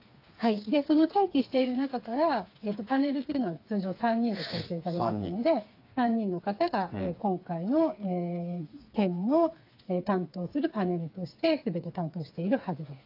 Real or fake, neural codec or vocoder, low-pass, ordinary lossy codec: fake; codec, 16 kHz, 4 kbps, FreqCodec, smaller model; 5.4 kHz; AAC, 32 kbps